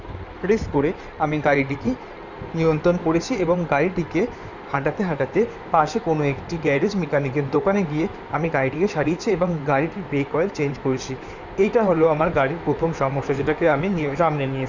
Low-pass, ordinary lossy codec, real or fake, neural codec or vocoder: 7.2 kHz; none; fake; codec, 16 kHz in and 24 kHz out, 2.2 kbps, FireRedTTS-2 codec